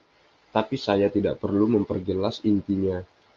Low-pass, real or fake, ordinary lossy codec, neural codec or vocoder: 7.2 kHz; real; Opus, 32 kbps; none